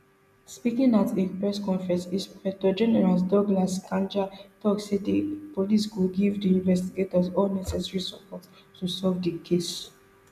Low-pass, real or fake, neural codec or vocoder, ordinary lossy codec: 14.4 kHz; real; none; none